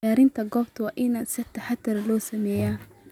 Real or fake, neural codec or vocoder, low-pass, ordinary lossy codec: real; none; 19.8 kHz; none